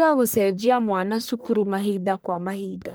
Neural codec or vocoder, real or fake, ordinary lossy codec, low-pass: codec, 44.1 kHz, 1.7 kbps, Pupu-Codec; fake; none; none